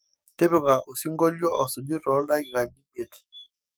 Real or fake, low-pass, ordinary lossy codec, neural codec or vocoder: fake; none; none; codec, 44.1 kHz, 7.8 kbps, DAC